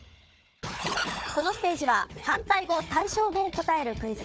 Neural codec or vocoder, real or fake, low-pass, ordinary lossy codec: codec, 16 kHz, 4 kbps, FunCodec, trained on Chinese and English, 50 frames a second; fake; none; none